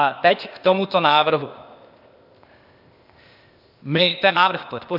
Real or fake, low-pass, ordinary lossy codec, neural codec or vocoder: fake; 5.4 kHz; MP3, 48 kbps; codec, 16 kHz, 0.8 kbps, ZipCodec